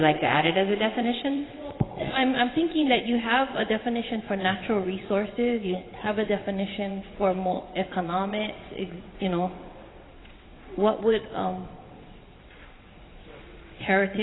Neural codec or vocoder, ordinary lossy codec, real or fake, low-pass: vocoder, 22.05 kHz, 80 mel bands, WaveNeXt; AAC, 16 kbps; fake; 7.2 kHz